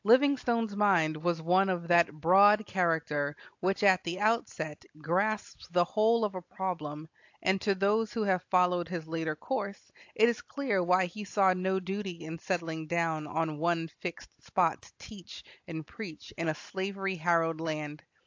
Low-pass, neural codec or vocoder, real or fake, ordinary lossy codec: 7.2 kHz; none; real; AAC, 48 kbps